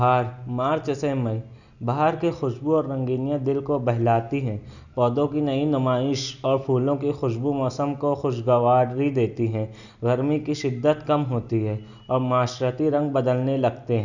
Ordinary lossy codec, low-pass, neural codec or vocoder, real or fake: none; 7.2 kHz; none; real